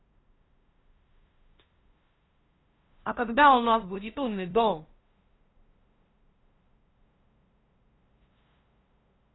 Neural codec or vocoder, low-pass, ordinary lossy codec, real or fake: codec, 16 kHz, 0.5 kbps, FunCodec, trained on LibriTTS, 25 frames a second; 7.2 kHz; AAC, 16 kbps; fake